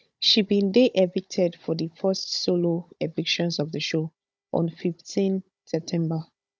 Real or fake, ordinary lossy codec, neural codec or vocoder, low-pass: fake; none; codec, 16 kHz, 16 kbps, FunCodec, trained on Chinese and English, 50 frames a second; none